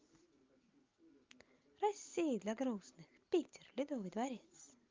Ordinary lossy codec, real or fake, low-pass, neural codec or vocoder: Opus, 24 kbps; real; 7.2 kHz; none